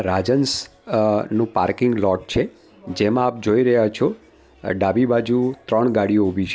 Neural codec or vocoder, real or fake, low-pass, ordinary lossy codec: none; real; none; none